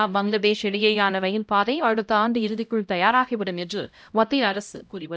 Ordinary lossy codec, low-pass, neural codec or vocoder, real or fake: none; none; codec, 16 kHz, 0.5 kbps, X-Codec, HuBERT features, trained on LibriSpeech; fake